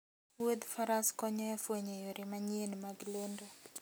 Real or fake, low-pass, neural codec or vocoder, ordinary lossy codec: real; none; none; none